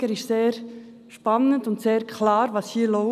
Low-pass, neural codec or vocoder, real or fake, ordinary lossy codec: 14.4 kHz; none; real; none